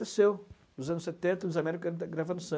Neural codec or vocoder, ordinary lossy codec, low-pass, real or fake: none; none; none; real